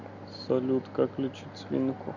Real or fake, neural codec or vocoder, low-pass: real; none; 7.2 kHz